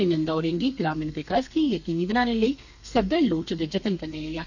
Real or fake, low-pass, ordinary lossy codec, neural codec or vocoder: fake; 7.2 kHz; none; codec, 32 kHz, 1.9 kbps, SNAC